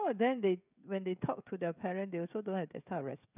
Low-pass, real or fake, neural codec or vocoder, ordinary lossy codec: 3.6 kHz; fake; codec, 16 kHz, 16 kbps, FreqCodec, smaller model; none